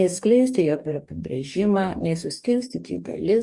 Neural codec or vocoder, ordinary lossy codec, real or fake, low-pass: codec, 44.1 kHz, 2.6 kbps, DAC; Opus, 64 kbps; fake; 10.8 kHz